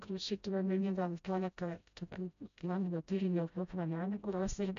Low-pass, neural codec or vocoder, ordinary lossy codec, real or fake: 7.2 kHz; codec, 16 kHz, 0.5 kbps, FreqCodec, smaller model; AAC, 64 kbps; fake